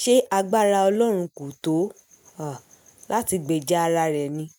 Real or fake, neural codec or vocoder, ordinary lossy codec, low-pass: real; none; none; none